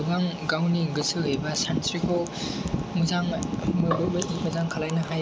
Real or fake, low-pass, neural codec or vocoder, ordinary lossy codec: real; none; none; none